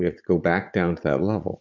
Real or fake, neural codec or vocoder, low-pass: fake; vocoder, 44.1 kHz, 80 mel bands, Vocos; 7.2 kHz